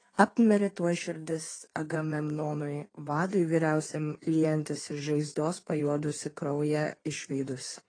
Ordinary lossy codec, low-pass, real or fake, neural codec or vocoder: AAC, 32 kbps; 9.9 kHz; fake; codec, 16 kHz in and 24 kHz out, 1.1 kbps, FireRedTTS-2 codec